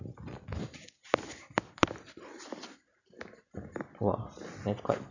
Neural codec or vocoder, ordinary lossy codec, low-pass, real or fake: none; none; 7.2 kHz; real